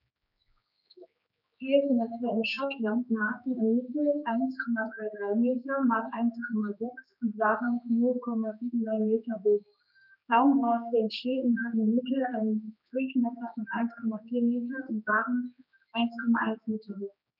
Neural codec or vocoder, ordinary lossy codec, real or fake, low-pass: codec, 16 kHz, 2 kbps, X-Codec, HuBERT features, trained on general audio; none; fake; 5.4 kHz